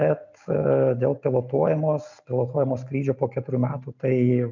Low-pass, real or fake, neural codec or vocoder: 7.2 kHz; real; none